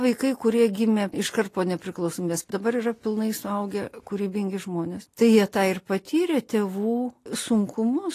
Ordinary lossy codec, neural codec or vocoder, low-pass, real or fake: AAC, 48 kbps; none; 14.4 kHz; real